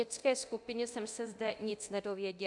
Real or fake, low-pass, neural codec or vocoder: fake; 10.8 kHz; codec, 24 kHz, 0.9 kbps, DualCodec